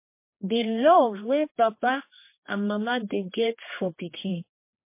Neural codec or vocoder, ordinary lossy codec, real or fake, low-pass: codec, 16 kHz, 2 kbps, X-Codec, HuBERT features, trained on general audio; MP3, 24 kbps; fake; 3.6 kHz